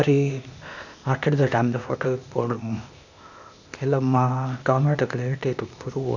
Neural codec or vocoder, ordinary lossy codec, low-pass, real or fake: codec, 16 kHz, 0.8 kbps, ZipCodec; none; 7.2 kHz; fake